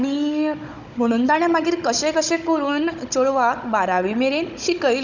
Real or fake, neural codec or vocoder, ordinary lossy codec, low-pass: fake; codec, 16 kHz, 16 kbps, FunCodec, trained on Chinese and English, 50 frames a second; none; 7.2 kHz